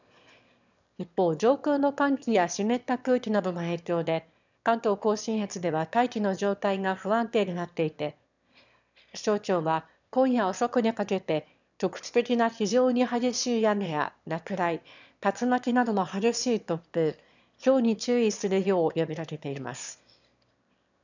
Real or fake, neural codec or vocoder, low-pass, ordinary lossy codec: fake; autoencoder, 22.05 kHz, a latent of 192 numbers a frame, VITS, trained on one speaker; 7.2 kHz; none